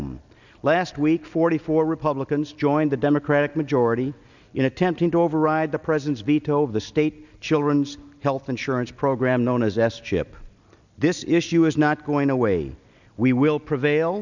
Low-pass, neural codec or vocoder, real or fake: 7.2 kHz; none; real